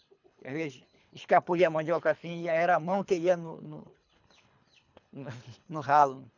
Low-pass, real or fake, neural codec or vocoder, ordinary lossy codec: 7.2 kHz; fake; codec, 24 kHz, 3 kbps, HILCodec; none